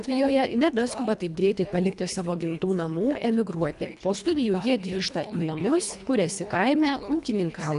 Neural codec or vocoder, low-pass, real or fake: codec, 24 kHz, 1.5 kbps, HILCodec; 10.8 kHz; fake